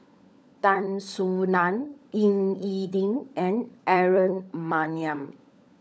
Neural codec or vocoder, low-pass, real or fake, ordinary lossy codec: codec, 16 kHz, 16 kbps, FunCodec, trained on LibriTTS, 50 frames a second; none; fake; none